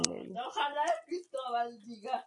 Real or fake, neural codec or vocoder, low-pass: real; none; 10.8 kHz